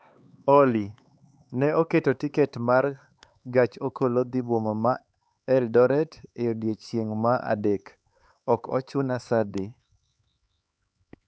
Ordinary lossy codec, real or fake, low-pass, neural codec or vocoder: none; fake; none; codec, 16 kHz, 4 kbps, X-Codec, HuBERT features, trained on LibriSpeech